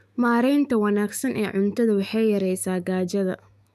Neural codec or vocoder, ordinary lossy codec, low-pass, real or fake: autoencoder, 48 kHz, 128 numbers a frame, DAC-VAE, trained on Japanese speech; none; 14.4 kHz; fake